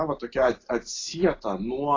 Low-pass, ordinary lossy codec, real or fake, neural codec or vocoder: 7.2 kHz; AAC, 32 kbps; real; none